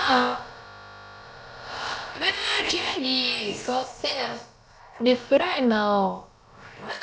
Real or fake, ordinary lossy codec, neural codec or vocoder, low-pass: fake; none; codec, 16 kHz, about 1 kbps, DyCAST, with the encoder's durations; none